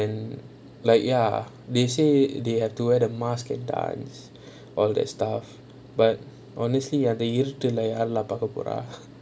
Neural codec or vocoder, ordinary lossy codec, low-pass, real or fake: none; none; none; real